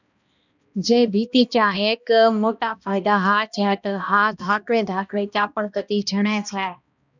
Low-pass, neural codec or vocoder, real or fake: 7.2 kHz; codec, 16 kHz, 1 kbps, X-Codec, HuBERT features, trained on balanced general audio; fake